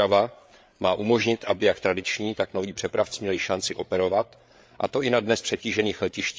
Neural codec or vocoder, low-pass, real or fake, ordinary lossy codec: codec, 16 kHz, 8 kbps, FreqCodec, larger model; none; fake; none